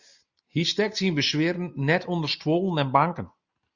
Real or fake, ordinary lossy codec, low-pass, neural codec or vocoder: real; Opus, 64 kbps; 7.2 kHz; none